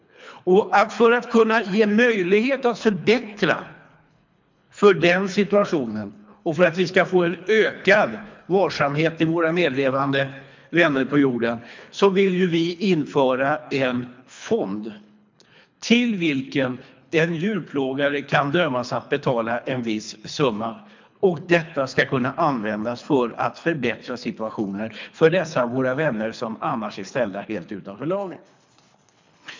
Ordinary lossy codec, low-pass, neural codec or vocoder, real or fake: MP3, 64 kbps; 7.2 kHz; codec, 24 kHz, 3 kbps, HILCodec; fake